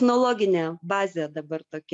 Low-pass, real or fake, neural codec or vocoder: 10.8 kHz; real; none